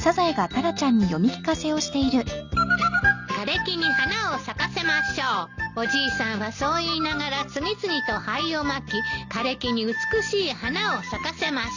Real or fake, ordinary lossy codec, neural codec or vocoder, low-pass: real; Opus, 64 kbps; none; 7.2 kHz